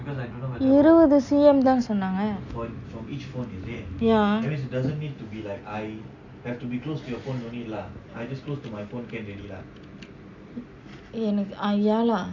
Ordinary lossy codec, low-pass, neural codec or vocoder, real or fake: none; 7.2 kHz; none; real